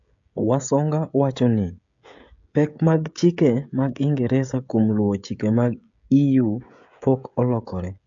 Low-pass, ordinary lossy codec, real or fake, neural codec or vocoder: 7.2 kHz; AAC, 64 kbps; fake; codec, 16 kHz, 16 kbps, FreqCodec, smaller model